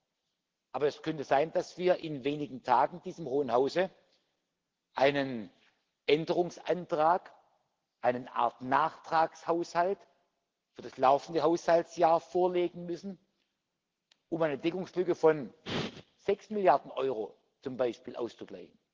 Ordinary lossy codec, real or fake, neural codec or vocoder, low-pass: Opus, 16 kbps; real; none; 7.2 kHz